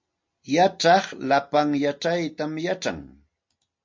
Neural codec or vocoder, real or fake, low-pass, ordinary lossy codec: none; real; 7.2 kHz; MP3, 48 kbps